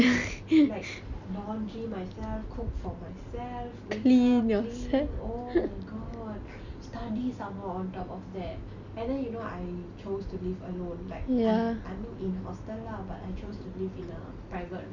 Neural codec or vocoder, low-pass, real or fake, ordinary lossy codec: none; 7.2 kHz; real; none